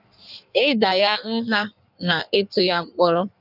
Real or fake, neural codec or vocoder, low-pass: fake; codec, 16 kHz in and 24 kHz out, 1.1 kbps, FireRedTTS-2 codec; 5.4 kHz